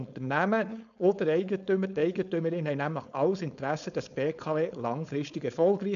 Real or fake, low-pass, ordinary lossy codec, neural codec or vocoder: fake; 7.2 kHz; none; codec, 16 kHz, 4.8 kbps, FACodec